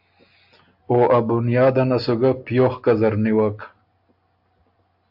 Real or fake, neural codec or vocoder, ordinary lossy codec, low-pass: real; none; AAC, 48 kbps; 5.4 kHz